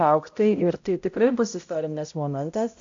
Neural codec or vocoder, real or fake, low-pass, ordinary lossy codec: codec, 16 kHz, 0.5 kbps, X-Codec, HuBERT features, trained on balanced general audio; fake; 7.2 kHz; AAC, 48 kbps